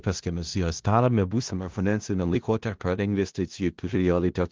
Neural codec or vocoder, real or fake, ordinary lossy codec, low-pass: codec, 16 kHz in and 24 kHz out, 0.4 kbps, LongCat-Audio-Codec, four codebook decoder; fake; Opus, 16 kbps; 7.2 kHz